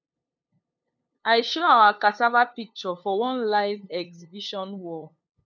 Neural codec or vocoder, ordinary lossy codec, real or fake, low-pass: codec, 16 kHz, 2 kbps, FunCodec, trained on LibriTTS, 25 frames a second; none; fake; 7.2 kHz